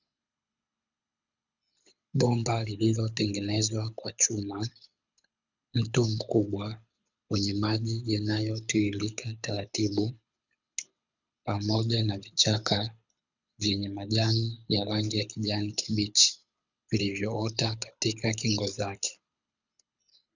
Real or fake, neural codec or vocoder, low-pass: fake; codec, 24 kHz, 6 kbps, HILCodec; 7.2 kHz